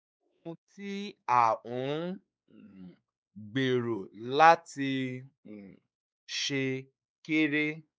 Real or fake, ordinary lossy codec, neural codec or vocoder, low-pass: fake; none; codec, 16 kHz, 4 kbps, X-Codec, WavLM features, trained on Multilingual LibriSpeech; none